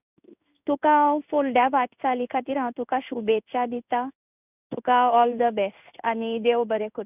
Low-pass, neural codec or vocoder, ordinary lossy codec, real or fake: 3.6 kHz; codec, 16 kHz in and 24 kHz out, 1 kbps, XY-Tokenizer; none; fake